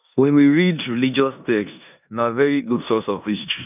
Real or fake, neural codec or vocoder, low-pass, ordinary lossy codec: fake; codec, 16 kHz in and 24 kHz out, 0.9 kbps, LongCat-Audio-Codec, four codebook decoder; 3.6 kHz; none